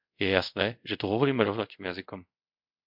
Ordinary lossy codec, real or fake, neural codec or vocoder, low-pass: MP3, 48 kbps; fake; codec, 24 kHz, 0.5 kbps, DualCodec; 5.4 kHz